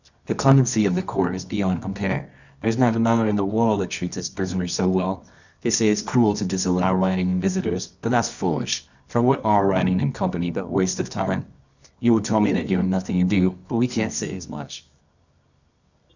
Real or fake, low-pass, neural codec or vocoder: fake; 7.2 kHz; codec, 24 kHz, 0.9 kbps, WavTokenizer, medium music audio release